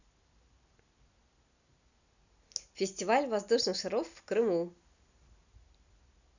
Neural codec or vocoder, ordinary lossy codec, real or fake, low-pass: none; none; real; 7.2 kHz